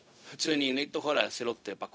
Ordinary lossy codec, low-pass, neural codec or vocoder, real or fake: none; none; codec, 16 kHz, 0.4 kbps, LongCat-Audio-Codec; fake